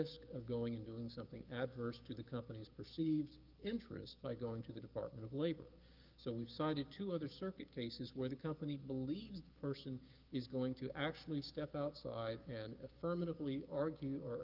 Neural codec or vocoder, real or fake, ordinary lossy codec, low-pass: codec, 16 kHz, 6 kbps, DAC; fake; Opus, 32 kbps; 5.4 kHz